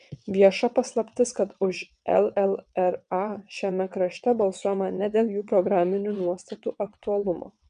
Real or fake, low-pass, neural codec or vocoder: fake; 9.9 kHz; vocoder, 22.05 kHz, 80 mel bands, WaveNeXt